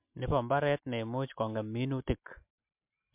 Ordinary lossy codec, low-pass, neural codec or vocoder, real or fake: MP3, 32 kbps; 3.6 kHz; none; real